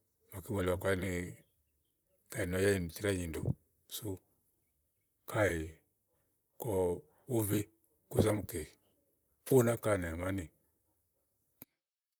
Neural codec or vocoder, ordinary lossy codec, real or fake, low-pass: vocoder, 44.1 kHz, 128 mel bands, Pupu-Vocoder; none; fake; none